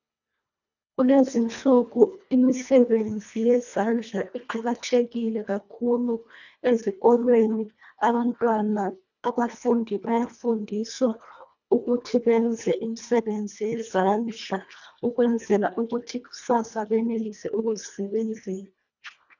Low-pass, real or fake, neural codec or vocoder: 7.2 kHz; fake; codec, 24 kHz, 1.5 kbps, HILCodec